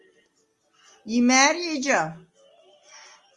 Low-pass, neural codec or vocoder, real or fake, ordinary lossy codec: 10.8 kHz; none; real; Opus, 32 kbps